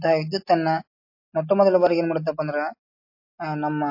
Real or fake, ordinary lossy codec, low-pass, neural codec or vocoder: real; MP3, 32 kbps; 5.4 kHz; none